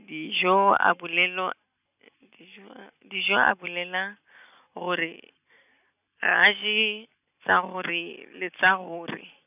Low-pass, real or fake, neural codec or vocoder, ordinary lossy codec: 3.6 kHz; real; none; none